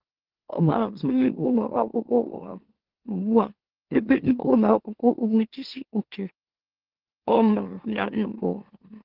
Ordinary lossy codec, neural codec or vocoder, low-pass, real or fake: Opus, 16 kbps; autoencoder, 44.1 kHz, a latent of 192 numbers a frame, MeloTTS; 5.4 kHz; fake